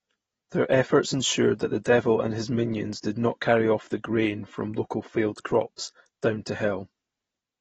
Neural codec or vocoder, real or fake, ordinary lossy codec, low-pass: none; real; AAC, 24 kbps; 19.8 kHz